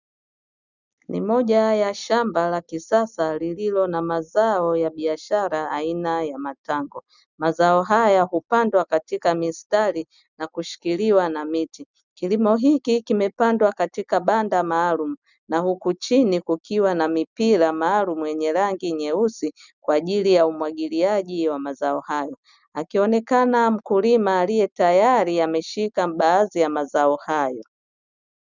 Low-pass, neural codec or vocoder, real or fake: 7.2 kHz; none; real